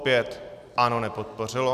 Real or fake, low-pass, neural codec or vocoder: real; 14.4 kHz; none